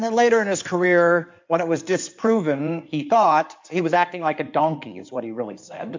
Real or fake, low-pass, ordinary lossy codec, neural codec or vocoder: fake; 7.2 kHz; MP3, 64 kbps; codec, 16 kHz in and 24 kHz out, 2.2 kbps, FireRedTTS-2 codec